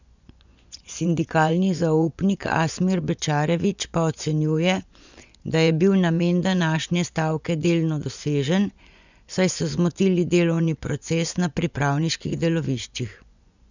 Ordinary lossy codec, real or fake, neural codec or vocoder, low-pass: none; fake; vocoder, 24 kHz, 100 mel bands, Vocos; 7.2 kHz